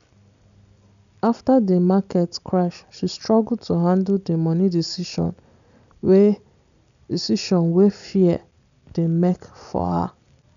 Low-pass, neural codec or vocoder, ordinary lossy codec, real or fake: 7.2 kHz; none; none; real